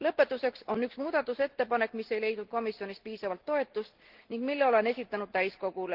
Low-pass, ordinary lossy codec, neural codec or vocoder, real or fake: 5.4 kHz; Opus, 16 kbps; none; real